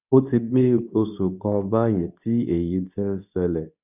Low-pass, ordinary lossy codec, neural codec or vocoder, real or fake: 3.6 kHz; none; codec, 24 kHz, 0.9 kbps, WavTokenizer, medium speech release version 2; fake